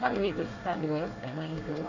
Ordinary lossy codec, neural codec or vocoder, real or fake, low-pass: none; codec, 24 kHz, 1 kbps, SNAC; fake; 7.2 kHz